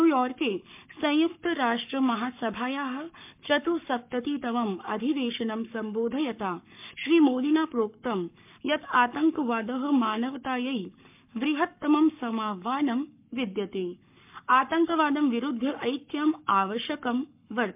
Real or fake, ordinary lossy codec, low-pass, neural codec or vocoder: fake; MP3, 32 kbps; 3.6 kHz; codec, 44.1 kHz, 7.8 kbps, Pupu-Codec